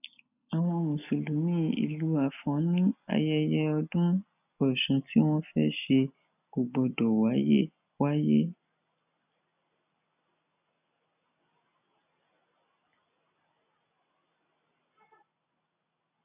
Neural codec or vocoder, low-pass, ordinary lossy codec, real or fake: none; 3.6 kHz; none; real